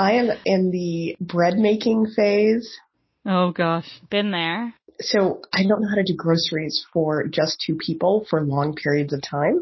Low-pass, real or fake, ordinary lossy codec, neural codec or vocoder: 7.2 kHz; real; MP3, 24 kbps; none